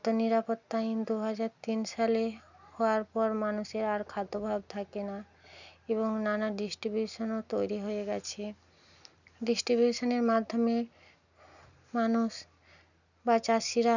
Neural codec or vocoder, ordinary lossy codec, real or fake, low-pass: none; none; real; 7.2 kHz